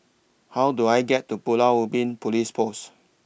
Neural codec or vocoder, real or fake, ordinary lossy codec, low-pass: none; real; none; none